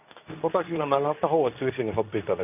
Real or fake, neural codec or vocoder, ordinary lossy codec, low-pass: fake; codec, 24 kHz, 0.9 kbps, WavTokenizer, medium speech release version 2; none; 3.6 kHz